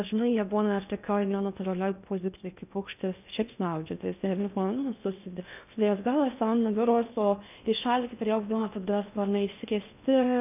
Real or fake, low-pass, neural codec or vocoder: fake; 3.6 kHz; codec, 16 kHz in and 24 kHz out, 0.6 kbps, FocalCodec, streaming, 2048 codes